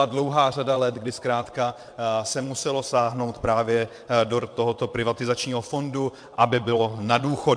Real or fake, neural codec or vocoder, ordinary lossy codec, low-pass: fake; vocoder, 22.05 kHz, 80 mel bands, WaveNeXt; MP3, 64 kbps; 9.9 kHz